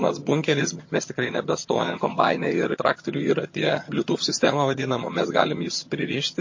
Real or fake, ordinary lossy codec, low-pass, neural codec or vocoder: fake; MP3, 32 kbps; 7.2 kHz; vocoder, 22.05 kHz, 80 mel bands, HiFi-GAN